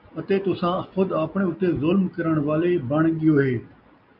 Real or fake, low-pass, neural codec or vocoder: real; 5.4 kHz; none